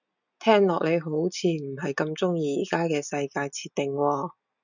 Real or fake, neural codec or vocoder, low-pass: real; none; 7.2 kHz